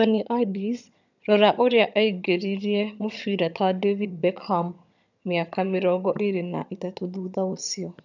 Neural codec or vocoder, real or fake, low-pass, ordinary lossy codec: vocoder, 22.05 kHz, 80 mel bands, HiFi-GAN; fake; 7.2 kHz; none